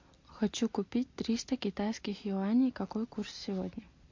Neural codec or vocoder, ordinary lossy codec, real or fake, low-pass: none; MP3, 48 kbps; real; 7.2 kHz